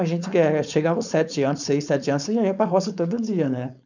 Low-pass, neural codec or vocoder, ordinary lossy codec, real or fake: 7.2 kHz; codec, 16 kHz, 4.8 kbps, FACodec; none; fake